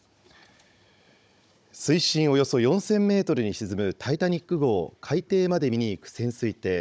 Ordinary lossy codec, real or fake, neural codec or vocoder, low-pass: none; fake; codec, 16 kHz, 16 kbps, FunCodec, trained on Chinese and English, 50 frames a second; none